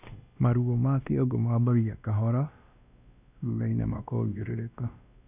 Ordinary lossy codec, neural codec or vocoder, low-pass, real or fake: none; codec, 16 kHz, 1 kbps, X-Codec, WavLM features, trained on Multilingual LibriSpeech; 3.6 kHz; fake